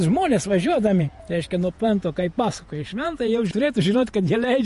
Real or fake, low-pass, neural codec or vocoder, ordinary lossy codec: fake; 14.4 kHz; vocoder, 48 kHz, 128 mel bands, Vocos; MP3, 48 kbps